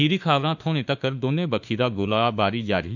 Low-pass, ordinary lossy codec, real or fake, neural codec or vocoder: 7.2 kHz; none; fake; autoencoder, 48 kHz, 32 numbers a frame, DAC-VAE, trained on Japanese speech